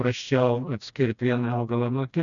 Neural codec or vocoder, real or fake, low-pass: codec, 16 kHz, 1 kbps, FreqCodec, smaller model; fake; 7.2 kHz